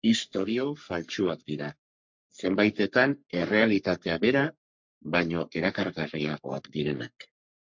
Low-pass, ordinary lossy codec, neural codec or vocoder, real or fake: 7.2 kHz; MP3, 64 kbps; codec, 44.1 kHz, 3.4 kbps, Pupu-Codec; fake